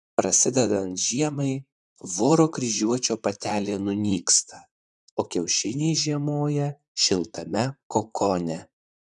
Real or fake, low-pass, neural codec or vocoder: fake; 10.8 kHz; vocoder, 44.1 kHz, 128 mel bands, Pupu-Vocoder